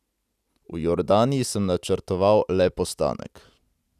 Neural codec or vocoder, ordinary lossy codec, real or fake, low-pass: none; none; real; 14.4 kHz